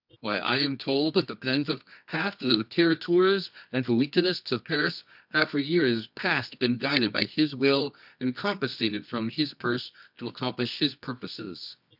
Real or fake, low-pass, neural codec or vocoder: fake; 5.4 kHz; codec, 24 kHz, 0.9 kbps, WavTokenizer, medium music audio release